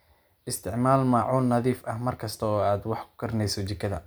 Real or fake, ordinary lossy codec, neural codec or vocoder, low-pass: real; none; none; none